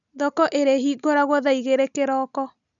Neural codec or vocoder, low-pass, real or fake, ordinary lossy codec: none; 7.2 kHz; real; none